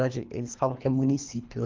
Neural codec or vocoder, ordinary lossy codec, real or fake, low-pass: codec, 24 kHz, 3 kbps, HILCodec; Opus, 24 kbps; fake; 7.2 kHz